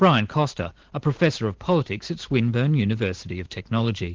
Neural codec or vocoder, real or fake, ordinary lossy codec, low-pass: vocoder, 44.1 kHz, 128 mel bands every 512 samples, BigVGAN v2; fake; Opus, 16 kbps; 7.2 kHz